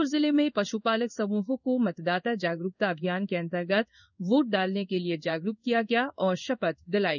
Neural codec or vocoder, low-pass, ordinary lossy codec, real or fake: codec, 16 kHz in and 24 kHz out, 1 kbps, XY-Tokenizer; 7.2 kHz; none; fake